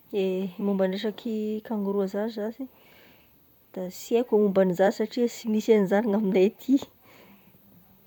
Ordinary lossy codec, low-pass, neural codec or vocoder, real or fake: none; 19.8 kHz; vocoder, 44.1 kHz, 128 mel bands, Pupu-Vocoder; fake